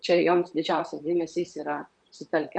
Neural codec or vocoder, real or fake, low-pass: vocoder, 44.1 kHz, 128 mel bands, Pupu-Vocoder; fake; 9.9 kHz